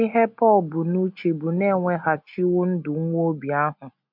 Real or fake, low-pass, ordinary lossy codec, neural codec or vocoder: real; 5.4 kHz; MP3, 48 kbps; none